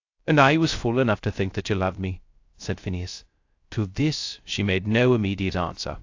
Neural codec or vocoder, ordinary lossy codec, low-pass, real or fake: codec, 16 kHz, 0.3 kbps, FocalCodec; AAC, 48 kbps; 7.2 kHz; fake